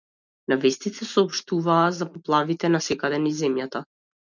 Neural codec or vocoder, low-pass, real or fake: none; 7.2 kHz; real